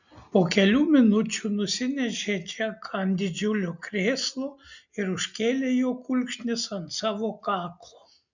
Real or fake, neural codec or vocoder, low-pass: real; none; 7.2 kHz